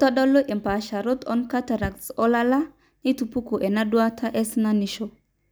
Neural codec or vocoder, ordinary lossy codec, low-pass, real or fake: none; none; none; real